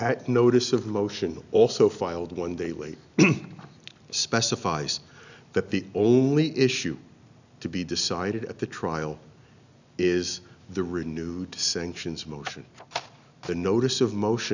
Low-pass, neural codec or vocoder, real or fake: 7.2 kHz; none; real